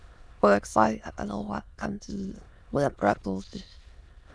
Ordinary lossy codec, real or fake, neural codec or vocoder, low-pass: none; fake; autoencoder, 22.05 kHz, a latent of 192 numbers a frame, VITS, trained on many speakers; none